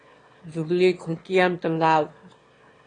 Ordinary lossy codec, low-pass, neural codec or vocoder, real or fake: AAC, 32 kbps; 9.9 kHz; autoencoder, 22.05 kHz, a latent of 192 numbers a frame, VITS, trained on one speaker; fake